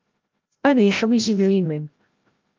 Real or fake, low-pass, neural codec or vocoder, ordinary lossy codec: fake; 7.2 kHz; codec, 16 kHz, 0.5 kbps, FreqCodec, larger model; Opus, 32 kbps